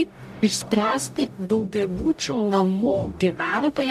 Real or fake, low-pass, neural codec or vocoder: fake; 14.4 kHz; codec, 44.1 kHz, 0.9 kbps, DAC